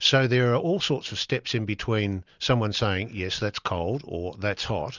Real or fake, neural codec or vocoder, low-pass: real; none; 7.2 kHz